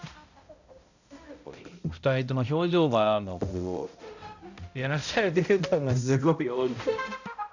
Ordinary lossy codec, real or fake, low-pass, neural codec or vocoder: none; fake; 7.2 kHz; codec, 16 kHz, 0.5 kbps, X-Codec, HuBERT features, trained on balanced general audio